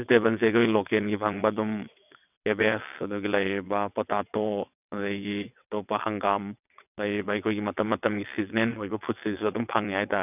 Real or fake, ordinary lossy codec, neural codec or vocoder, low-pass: fake; none; vocoder, 22.05 kHz, 80 mel bands, WaveNeXt; 3.6 kHz